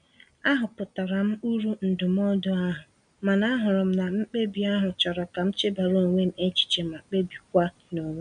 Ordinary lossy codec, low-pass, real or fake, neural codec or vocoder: none; 9.9 kHz; real; none